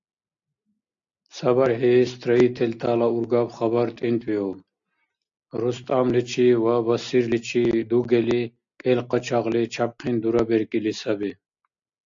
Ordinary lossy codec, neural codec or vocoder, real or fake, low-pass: AAC, 64 kbps; none; real; 7.2 kHz